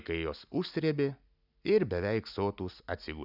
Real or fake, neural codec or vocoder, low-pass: real; none; 5.4 kHz